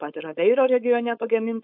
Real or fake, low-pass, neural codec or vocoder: fake; 5.4 kHz; codec, 16 kHz, 4.8 kbps, FACodec